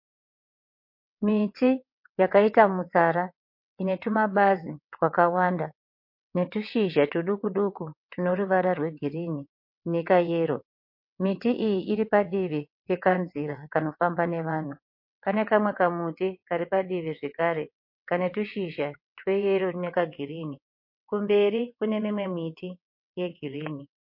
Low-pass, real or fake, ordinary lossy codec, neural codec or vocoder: 5.4 kHz; fake; MP3, 32 kbps; vocoder, 22.05 kHz, 80 mel bands, WaveNeXt